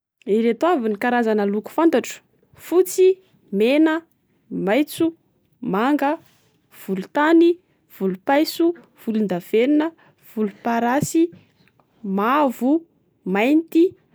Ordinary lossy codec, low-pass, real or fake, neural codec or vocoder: none; none; real; none